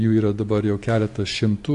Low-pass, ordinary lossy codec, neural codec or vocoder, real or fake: 10.8 kHz; AAC, 64 kbps; none; real